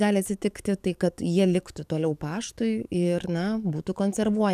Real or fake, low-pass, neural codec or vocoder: fake; 14.4 kHz; codec, 44.1 kHz, 7.8 kbps, DAC